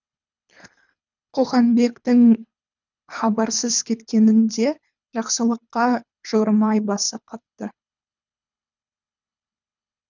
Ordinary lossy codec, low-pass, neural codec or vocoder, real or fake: none; 7.2 kHz; codec, 24 kHz, 3 kbps, HILCodec; fake